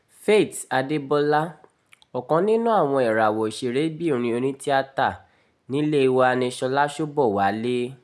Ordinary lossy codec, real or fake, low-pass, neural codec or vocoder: none; real; none; none